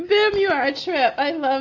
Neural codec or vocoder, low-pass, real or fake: none; 7.2 kHz; real